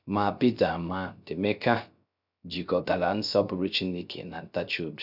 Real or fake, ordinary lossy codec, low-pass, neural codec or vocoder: fake; none; 5.4 kHz; codec, 16 kHz, 0.3 kbps, FocalCodec